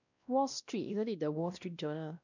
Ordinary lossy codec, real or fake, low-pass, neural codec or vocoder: none; fake; 7.2 kHz; codec, 16 kHz, 1 kbps, X-Codec, WavLM features, trained on Multilingual LibriSpeech